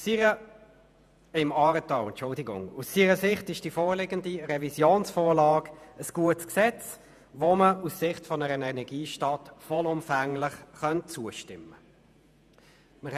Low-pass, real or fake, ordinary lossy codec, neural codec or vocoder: 14.4 kHz; fake; none; vocoder, 48 kHz, 128 mel bands, Vocos